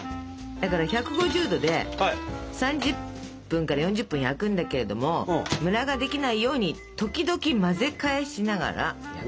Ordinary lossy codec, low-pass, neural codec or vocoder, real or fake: none; none; none; real